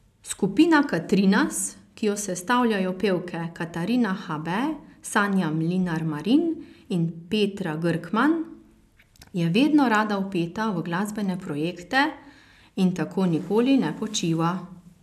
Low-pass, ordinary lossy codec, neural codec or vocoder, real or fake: 14.4 kHz; none; none; real